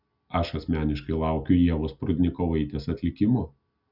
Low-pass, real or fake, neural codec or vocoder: 5.4 kHz; real; none